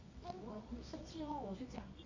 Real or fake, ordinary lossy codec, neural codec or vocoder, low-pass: fake; MP3, 48 kbps; codec, 24 kHz, 0.9 kbps, WavTokenizer, medium music audio release; 7.2 kHz